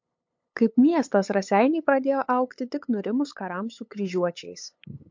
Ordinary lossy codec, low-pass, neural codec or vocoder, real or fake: MP3, 48 kbps; 7.2 kHz; codec, 16 kHz, 8 kbps, FunCodec, trained on LibriTTS, 25 frames a second; fake